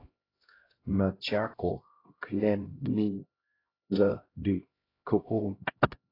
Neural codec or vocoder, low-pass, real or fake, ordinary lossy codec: codec, 16 kHz, 0.5 kbps, X-Codec, HuBERT features, trained on LibriSpeech; 5.4 kHz; fake; AAC, 24 kbps